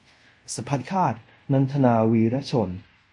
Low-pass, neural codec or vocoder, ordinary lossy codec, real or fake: 10.8 kHz; codec, 24 kHz, 0.5 kbps, DualCodec; MP3, 48 kbps; fake